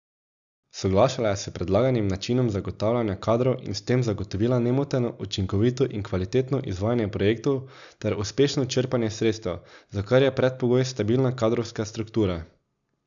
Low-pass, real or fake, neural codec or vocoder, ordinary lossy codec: 7.2 kHz; real; none; none